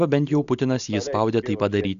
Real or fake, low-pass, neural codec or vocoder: real; 7.2 kHz; none